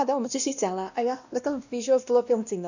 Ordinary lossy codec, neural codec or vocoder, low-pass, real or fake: none; codec, 16 kHz, 1 kbps, X-Codec, WavLM features, trained on Multilingual LibriSpeech; 7.2 kHz; fake